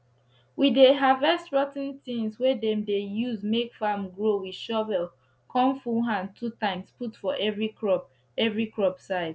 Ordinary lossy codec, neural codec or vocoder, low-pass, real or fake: none; none; none; real